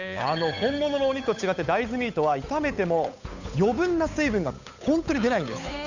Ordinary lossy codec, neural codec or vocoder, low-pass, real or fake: none; codec, 16 kHz, 8 kbps, FunCodec, trained on Chinese and English, 25 frames a second; 7.2 kHz; fake